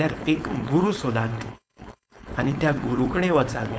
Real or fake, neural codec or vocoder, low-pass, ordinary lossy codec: fake; codec, 16 kHz, 4.8 kbps, FACodec; none; none